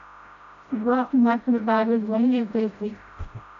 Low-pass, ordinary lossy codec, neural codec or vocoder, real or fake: 7.2 kHz; AAC, 64 kbps; codec, 16 kHz, 0.5 kbps, FreqCodec, smaller model; fake